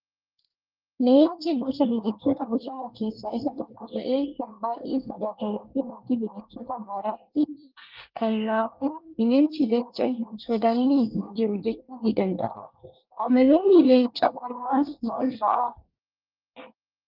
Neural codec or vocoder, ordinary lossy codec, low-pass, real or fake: codec, 24 kHz, 1 kbps, SNAC; Opus, 24 kbps; 5.4 kHz; fake